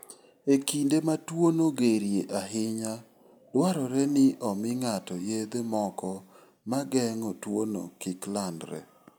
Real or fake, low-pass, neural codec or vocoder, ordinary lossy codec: real; none; none; none